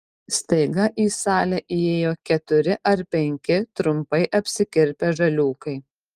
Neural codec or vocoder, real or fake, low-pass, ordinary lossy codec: none; real; 14.4 kHz; Opus, 32 kbps